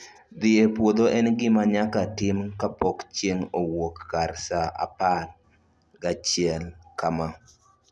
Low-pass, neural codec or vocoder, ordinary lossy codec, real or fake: none; none; none; real